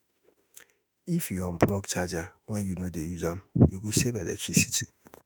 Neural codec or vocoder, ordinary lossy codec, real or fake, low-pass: autoencoder, 48 kHz, 32 numbers a frame, DAC-VAE, trained on Japanese speech; none; fake; none